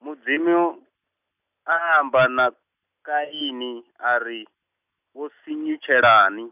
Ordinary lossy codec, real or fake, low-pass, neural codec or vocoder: none; real; 3.6 kHz; none